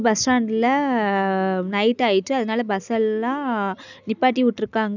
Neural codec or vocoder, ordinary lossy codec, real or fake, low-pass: none; none; real; 7.2 kHz